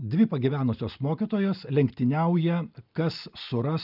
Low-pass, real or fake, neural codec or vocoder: 5.4 kHz; real; none